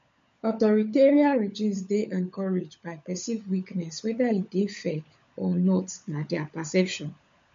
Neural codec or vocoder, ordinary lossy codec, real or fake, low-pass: codec, 16 kHz, 16 kbps, FunCodec, trained on LibriTTS, 50 frames a second; MP3, 48 kbps; fake; 7.2 kHz